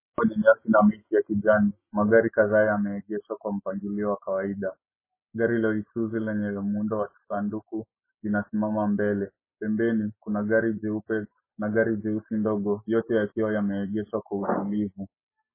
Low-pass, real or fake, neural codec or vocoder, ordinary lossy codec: 3.6 kHz; real; none; MP3, 16 kbps